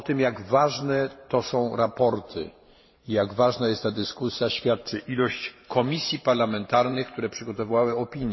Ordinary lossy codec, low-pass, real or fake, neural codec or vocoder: MP3, 24 kbps; 7.2 kHz; fake; vocoder, 44.1 kHz, 128 mel bands every 512 samples, BigVGAN v2